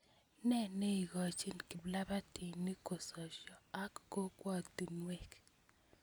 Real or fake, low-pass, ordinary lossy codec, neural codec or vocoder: real; none; none; none